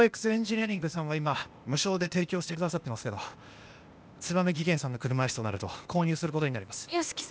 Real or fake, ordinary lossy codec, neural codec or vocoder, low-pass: fake; none; codec, 16 kHz, 0.8 kbps, ZipCodec; none